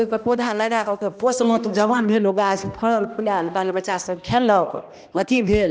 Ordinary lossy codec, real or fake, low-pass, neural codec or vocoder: none; fake; none; codec, 16 kHz, 1 kbps, X-Codec, HuBERT features, trained on balanced general audio